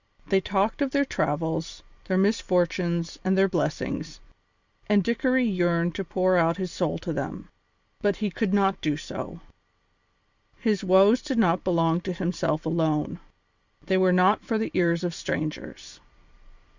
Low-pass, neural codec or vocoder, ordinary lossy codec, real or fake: 7.2 kHz; vocoder, 44.1 kHz, 128 mel bands every 256 samples, BigVGAN v2; Opus, 64 kbps; fake